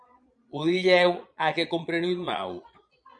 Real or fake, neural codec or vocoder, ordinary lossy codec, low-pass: fake; vocoder, 22.05 kHz, 80 mel bands, Vocos; MP3, 96 kbps; 9.9 kHz